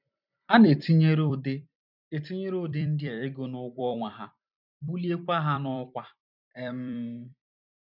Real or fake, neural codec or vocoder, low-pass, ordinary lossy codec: fake; vocoder, 44.1 kHz, 128 mel bands every 256 samples, BigVGAN v2; 5.4 kHz; none